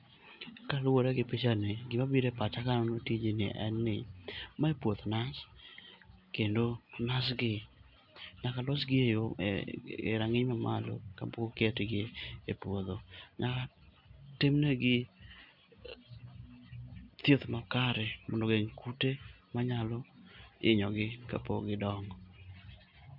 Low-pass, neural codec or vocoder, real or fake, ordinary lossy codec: 5.4 kHz; none; real; none